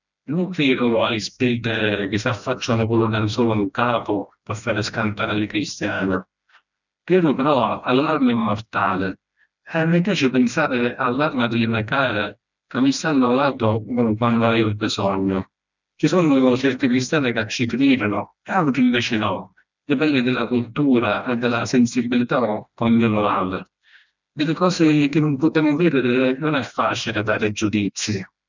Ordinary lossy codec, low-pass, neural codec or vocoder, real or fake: none; 7.2 kHz; codec, 16 kHz, 1 kbps, FreqCodec, smaller model; fake